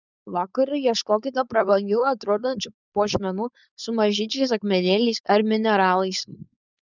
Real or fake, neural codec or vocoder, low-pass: fake; codec, 16 kHz, 4.8 kbps, FACodec; 7.2 kHz